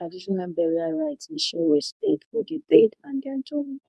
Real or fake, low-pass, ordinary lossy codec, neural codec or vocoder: fake; none; none; codec, 24 kHz, 0.9 kbps, WavTokenizer, medium speech release version 2